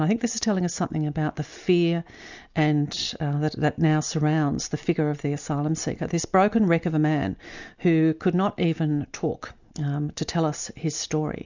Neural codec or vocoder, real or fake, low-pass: none; real; 7.2 kHz